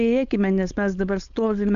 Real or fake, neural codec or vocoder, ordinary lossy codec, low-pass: fake; codec, 16 kHz, 4.8 kbps, FACodec; MP3, 96 kbps; 7.2 kHz